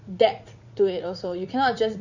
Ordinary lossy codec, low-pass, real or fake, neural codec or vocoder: none; 7.2 kHz; fake; vocoder, 44.1 kHz, 80 mel bands, Vocos